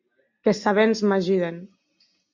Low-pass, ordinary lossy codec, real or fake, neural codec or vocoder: 7.2 kHz; MP3, 48 kbps; real; none